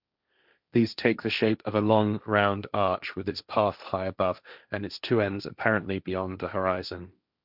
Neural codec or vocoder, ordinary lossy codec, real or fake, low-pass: codec, 16 kHz, 1.1 kbps, Voila-Tokenizer; none; fake; 5.4 kHz